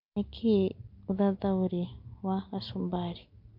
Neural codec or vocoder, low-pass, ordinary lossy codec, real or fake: none; 5.4 kHz; MP3, 48 kbps; real